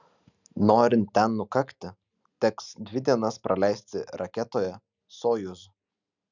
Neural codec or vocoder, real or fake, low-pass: none; real; 7.2 kHz